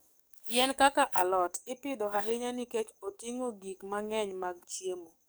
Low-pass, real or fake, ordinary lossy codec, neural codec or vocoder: none; fake; none; codec, 44.1 kHz, 7.8 kbps, DAC